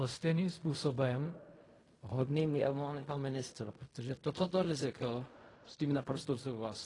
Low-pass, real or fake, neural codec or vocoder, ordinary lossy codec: 10.8 kHz; fake; codec, 16 kHz in and 24 kHz out, 0.4 kbps, LongCat-Audio-Codec, fine tuned four codebook decoder; AAC, 32 kbps